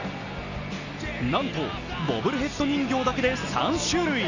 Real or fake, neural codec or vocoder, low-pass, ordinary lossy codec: real; none; 7.2 kHz; none